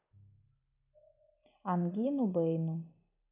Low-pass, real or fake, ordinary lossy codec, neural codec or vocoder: 3.6 kHz; real; none; none